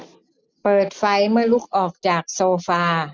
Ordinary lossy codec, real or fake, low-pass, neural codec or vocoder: none; real; none; none